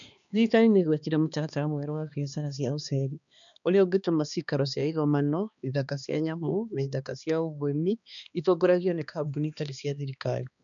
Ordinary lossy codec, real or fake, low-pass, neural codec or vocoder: MP3, 96 kbps; fake; 7.2 kHz; codec, 16 kHz, 2 kbps, X-Codec, HuBERT features, trained on balanced general audio